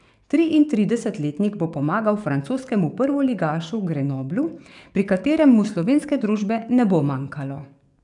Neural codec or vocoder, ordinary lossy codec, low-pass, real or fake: codec, 44.1 kHz, 7.8 kbps, DAC; none; 10.8 kHz; fake